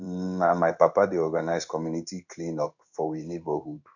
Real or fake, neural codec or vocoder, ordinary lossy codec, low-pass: fake; codec, 16 kHz in and 24 kHz out, 1 kbps, XY-Tokenizer; none; 7.2 kHz